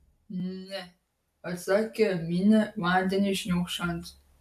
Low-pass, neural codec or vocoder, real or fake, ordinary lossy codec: 14.4 kHz; none; real; AAC, 96 kbps